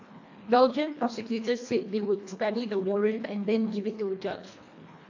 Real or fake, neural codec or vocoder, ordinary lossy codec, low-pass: fake; codec, 24 kHz, 1.5 kbps, HILCodec; AAC, 48 kbps; 7.2 kHz